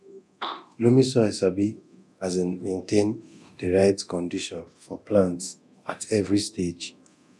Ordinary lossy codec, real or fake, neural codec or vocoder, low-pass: none; fake; codec, 24 kHz, 0.9 kbps, DualCodec; none